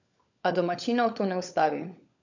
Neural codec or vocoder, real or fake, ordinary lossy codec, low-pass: codec, 16 kHz, 16 kbps, FunCodec, trained on LibriTTS, 50 frames a second; fake; none; 7.2 kHz